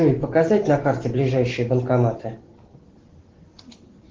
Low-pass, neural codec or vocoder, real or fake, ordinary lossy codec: 7.2 kHz; none; real; Opus, 16 kbps